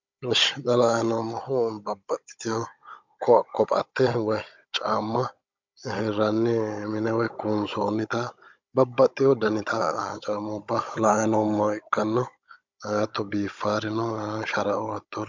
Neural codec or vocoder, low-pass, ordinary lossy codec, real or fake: codec, 16 kHz, 16 kbps, FunCodec, trained on Chinese and English, 50 frames a second; 7.2 kHz; MP3, 64 kbps; fake